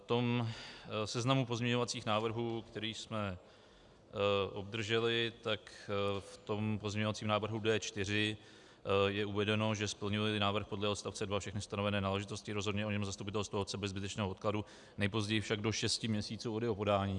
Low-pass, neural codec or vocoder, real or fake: 10.8 kHz; none; real